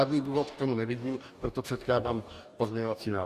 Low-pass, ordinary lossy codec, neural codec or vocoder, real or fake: 14.4 kHz; Opus, 64 kbps; codec, 44.1 kHz, 2.6 kbps, DAC; fake